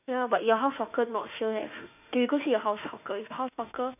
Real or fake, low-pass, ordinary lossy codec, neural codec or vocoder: fake; 3.6 kHz; none; autoencoder, 48 kHz, 32 numbers a frame, DAC-VAE, trained on Japanese speech